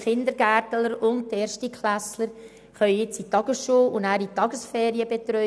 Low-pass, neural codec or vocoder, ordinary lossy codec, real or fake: none; none; none; real